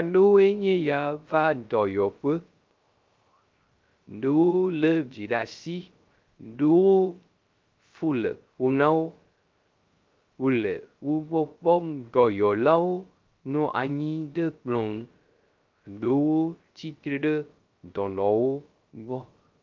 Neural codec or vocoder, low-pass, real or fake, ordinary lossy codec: codec, 16 kHz, 0.3 kbps, FocalCodec; 7.2 kHz; fake; Opus, 24 kbps